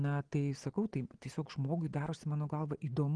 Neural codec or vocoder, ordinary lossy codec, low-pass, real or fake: none; Opus, 16 kbps; 9.9 kHz; real